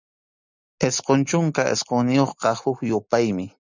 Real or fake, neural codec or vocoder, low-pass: real; none; 7.2 kHz